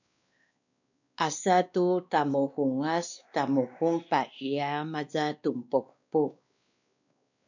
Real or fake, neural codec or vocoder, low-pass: fake; codec, 16 kHz, 2 kbps, X-Codec, WavLM features, trained on Multilingual LibriSpeech; 7.2 kHz